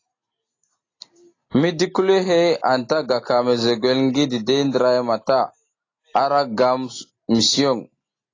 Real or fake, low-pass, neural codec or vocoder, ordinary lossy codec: real; 7.2 kHz; none; AAC, 32 kbps